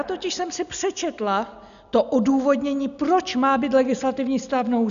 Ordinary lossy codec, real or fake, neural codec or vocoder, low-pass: MP3, 96 kbps; real; none; 7.2 kHz